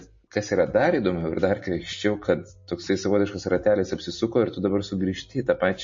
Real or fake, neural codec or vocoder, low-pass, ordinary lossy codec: real; none; 7.2 kHz; MP3, 32 kbps